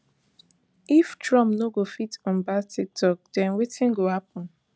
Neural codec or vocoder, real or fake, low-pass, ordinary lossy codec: none; real; none; none